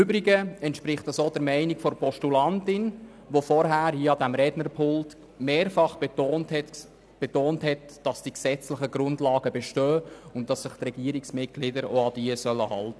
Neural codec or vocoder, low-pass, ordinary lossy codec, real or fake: none; none; none; real